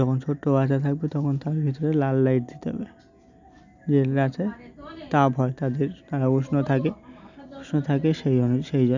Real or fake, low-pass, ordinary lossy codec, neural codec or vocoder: real; 7.2 kHz; none; none